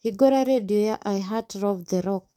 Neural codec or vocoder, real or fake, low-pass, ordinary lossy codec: codec, 44.1 kHz, 7.8 kbps, DAC; fake; 19.8 kHz; none